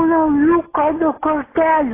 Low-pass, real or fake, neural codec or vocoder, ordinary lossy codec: 3.6 kHz; real; none; AAC, 16 kbps